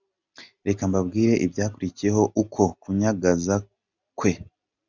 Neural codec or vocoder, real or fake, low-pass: none; real; 7.2 kHz